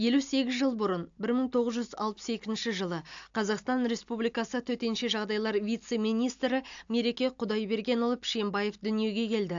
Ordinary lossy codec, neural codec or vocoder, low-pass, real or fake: AAC, 64 kbps; none; 7.2 kHz; real